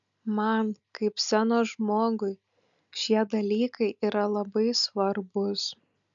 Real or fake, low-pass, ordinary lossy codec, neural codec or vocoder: real; 7.2 kHz; MP3, 96 kbps; none